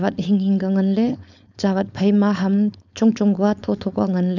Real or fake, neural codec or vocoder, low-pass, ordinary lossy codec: fake; codec, 16 kHz, 4.8 kbps, FACodec; 7.2 kHz; none